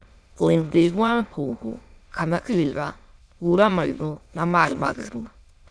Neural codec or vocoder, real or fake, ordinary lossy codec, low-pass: autoencoder, 22.05 kHz, a latent of 192 numbers a frame, VITS, trained on many speakers; fake; none; none